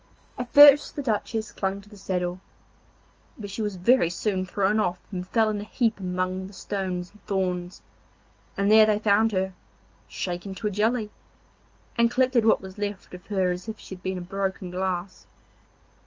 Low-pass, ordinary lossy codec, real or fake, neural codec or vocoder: 7.2 kHz; Opus, 24 kbps; real; none